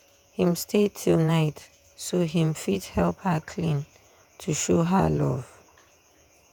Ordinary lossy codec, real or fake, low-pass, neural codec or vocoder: none; fake; none; vocoder, 48 kHz, 128 mel bands, Vocos